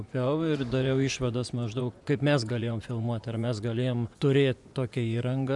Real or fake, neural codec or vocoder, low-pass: fake; vocoder, 24 kHz, 100 mel bands, Vocos; 10.8 kHz